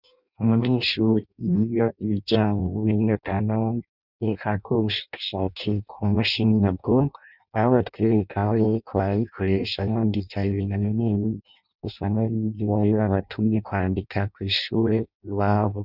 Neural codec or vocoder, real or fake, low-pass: codec, 16 kHz in and 24 kHz out, 0.6 kbps, FireRedTTS-2 codec; fake; 5.4 kHz